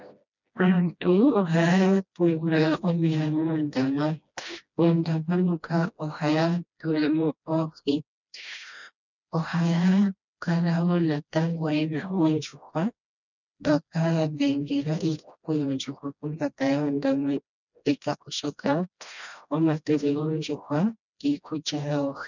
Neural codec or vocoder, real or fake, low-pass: codec, 16 kHz, 1 kbps, FreqCodec, smaller model; fake; 7.2 kHz